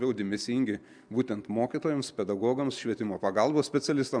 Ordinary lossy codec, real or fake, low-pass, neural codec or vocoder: MP3, 96 kbps; real; 9.9 kHz; none